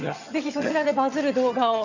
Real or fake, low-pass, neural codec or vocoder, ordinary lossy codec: fake; 7.2 kHz; vocoder, 22.05 kHz, 80 mel bands, HiFi-GAN; none